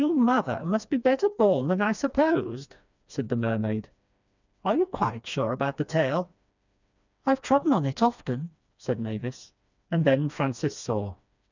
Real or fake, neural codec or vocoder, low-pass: fake; codec, 16 kHz, 2 kbps, FreqCodec, smaller model; 7.2 kHz